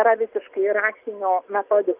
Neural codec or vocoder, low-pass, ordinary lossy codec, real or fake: none; 3.6 kHz; Opus, 32 kbps; real